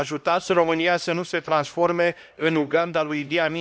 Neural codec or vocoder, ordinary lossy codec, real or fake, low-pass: codec, 16 kHz, 1 kbps, X-Codec, HuBERT features, trained on LibriSpeech; none; fake; none